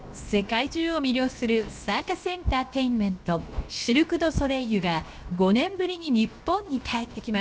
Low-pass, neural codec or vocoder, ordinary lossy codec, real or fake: none; codec, 16 kHz, 0.7 kbps, FocalCodec; none; fake